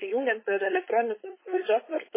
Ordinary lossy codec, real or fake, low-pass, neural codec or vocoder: MP3, 16 kbps; fake; 3.6 kHz; codec, 16 kHz, 4.8 kbps, FACodec